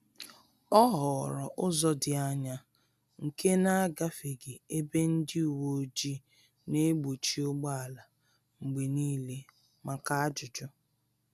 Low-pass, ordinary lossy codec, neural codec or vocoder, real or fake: 14.4 kHz; none; none; real